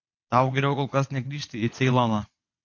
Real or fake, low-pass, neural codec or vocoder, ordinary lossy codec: fake; 7.2 kHz; vocoder, 22.05 kHz, 80 mel bands, WaveNeXt; AAC, 48 kbps